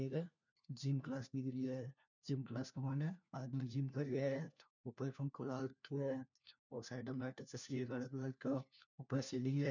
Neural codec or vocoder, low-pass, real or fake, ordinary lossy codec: codec, 16 kHz, 1 kbps, FunCodec, trained on LibriTTS, 50 frames a second; 7.2 kHz; fake; none